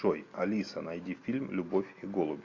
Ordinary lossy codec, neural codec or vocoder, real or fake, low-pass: MP3, 64 kbps; none; real; 7.2 kHz